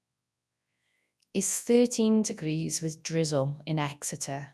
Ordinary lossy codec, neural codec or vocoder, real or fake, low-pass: none; codec, 24 kHz, 0.9 kbps, WavTokenizer, large speech release; fake; none